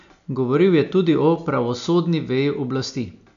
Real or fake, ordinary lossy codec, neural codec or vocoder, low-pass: real; none; none; 7.2 kHz